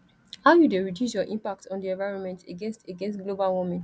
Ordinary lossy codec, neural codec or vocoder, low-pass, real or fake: none; none; none; real